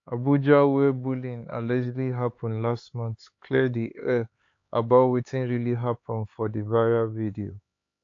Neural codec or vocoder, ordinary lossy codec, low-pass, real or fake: codec, 16 kHz, 4 kbps, X-Codec, HuBERT features, trained on LibriSpeech; AAC, 48 kbps; 7.2 kHz; fake